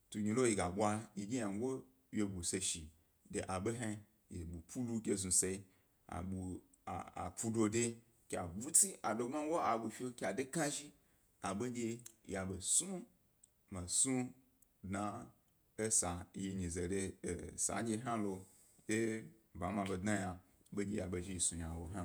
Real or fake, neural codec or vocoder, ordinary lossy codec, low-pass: fake; vocoder, 48 kHz, 128 mel bands, Vocos; none; none